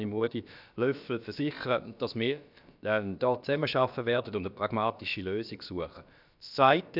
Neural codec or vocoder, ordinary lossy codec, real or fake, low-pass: codec, 16 kHz, about 1 kbps, DyCAST, with the encoder's durations; none; fake; 5.4 kHz